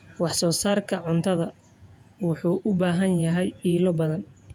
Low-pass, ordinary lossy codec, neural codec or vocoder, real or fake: 19.8 kHz; none; vocoder, 48 kHz, 128 mel bands, Vocos; fake